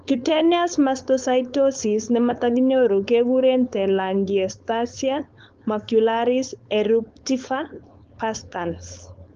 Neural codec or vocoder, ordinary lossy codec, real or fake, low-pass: codec, 16 kHz, 4.8 kbps, FACodec; Opus, 32 kbps; fake; 7.2 kHz